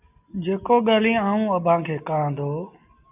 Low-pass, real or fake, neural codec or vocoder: 3.6 kHz; real; none